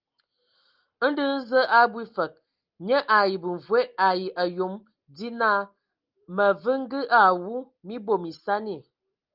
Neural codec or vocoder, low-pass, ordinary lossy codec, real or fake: none; 5.4 kHz; Opus, 32 kbps; real